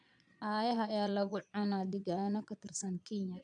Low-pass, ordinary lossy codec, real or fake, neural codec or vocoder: 9.9 kHz; none; fake; vocoder, 22.05 kHz, 80 mel bands, Vocos